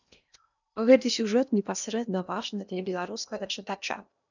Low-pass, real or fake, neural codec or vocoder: 7.2 kHz; fake; codec, 16 kHz in and 24 kHz out, 0.8 kbps, FocalCodec, streaming, 65536 codes